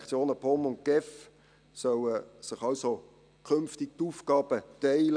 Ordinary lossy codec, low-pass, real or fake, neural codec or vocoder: none; 9.9 kHz; real; none